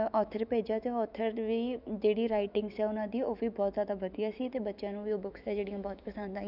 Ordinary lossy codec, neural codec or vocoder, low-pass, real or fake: none; autoencoder, 48 kHz, 128 numbers a frame, DAC-VAE, trained on Japanese speech; 5.4 kHz; fake